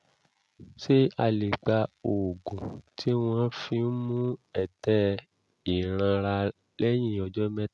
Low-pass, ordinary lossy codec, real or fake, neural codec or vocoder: none; none; real; none